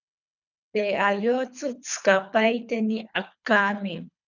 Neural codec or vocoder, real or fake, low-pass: codec, 24 kHz, 3 kbps, HILCodec; fake; 7.2 kHz